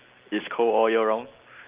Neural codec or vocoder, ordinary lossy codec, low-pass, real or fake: none; Opus, 24 kbps; 3.6 kHz; real